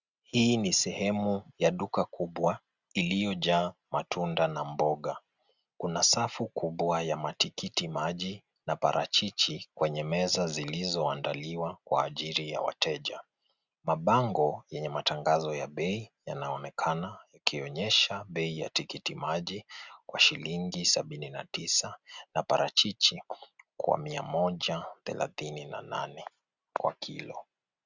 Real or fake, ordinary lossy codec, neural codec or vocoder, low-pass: real; Opus, 64 kbps; none; 7.2 kHz